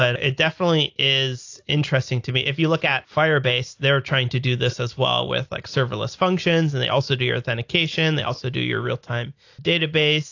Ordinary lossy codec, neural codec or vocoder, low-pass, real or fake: AAC, 48 kbps; none; 7.2 kHz; real